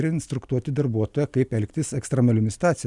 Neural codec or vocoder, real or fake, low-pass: none; real; 10.8 kHz